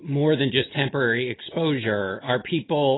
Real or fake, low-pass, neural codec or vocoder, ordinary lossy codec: real; 7.2 kHz; none; AAC, 16 kbps